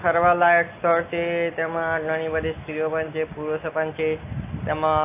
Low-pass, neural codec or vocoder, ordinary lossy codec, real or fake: 3.6 kHz; none; AAC, 24 kbps; real